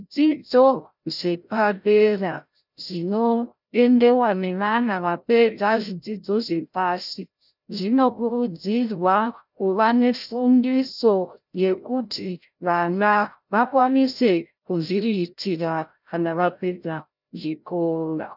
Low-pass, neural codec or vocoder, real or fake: 5.4 kHz; codec, 16 kHz, 0.5 kbps, FreqCodec, larger model; fake